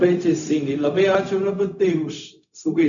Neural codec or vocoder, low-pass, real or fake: codec, 16 kHz, 0.4 kbps, LongCat-Audio-Codec; 7.2 kHz; fake